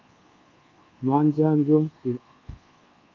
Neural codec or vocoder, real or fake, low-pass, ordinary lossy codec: codec, 24 kHz, 1.2 kbps, DualCodec; fake; 7.2 kHz; Opus, 32 kbps